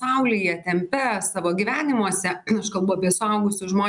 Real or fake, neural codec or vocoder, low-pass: real; none; 10.8 kHz